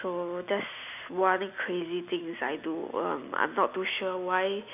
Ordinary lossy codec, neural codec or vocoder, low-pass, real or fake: none; none; 3.6 kHz; real